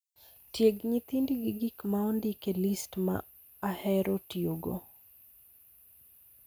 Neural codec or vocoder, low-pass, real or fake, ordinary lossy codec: none; none; real; none